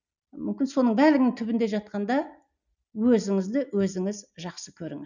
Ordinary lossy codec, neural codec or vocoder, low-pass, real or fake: none; none; 7.2 kHz; real